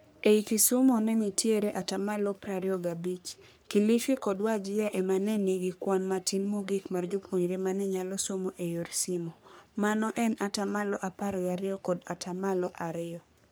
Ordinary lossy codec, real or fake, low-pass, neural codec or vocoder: none; fake; none; codec, 44.1 kHz, 3.4 kbps, Pupu-Codec